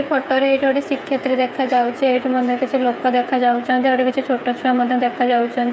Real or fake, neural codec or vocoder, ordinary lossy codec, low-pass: fake; codec, 16 kHz, 8 kbps, FreqCodec, smaller model; none; none